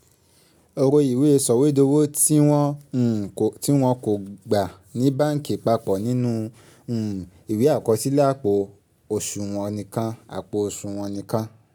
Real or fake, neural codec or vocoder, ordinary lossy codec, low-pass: real; none; none; none